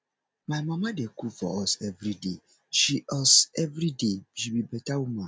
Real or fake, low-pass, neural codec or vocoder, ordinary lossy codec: real; none; none; none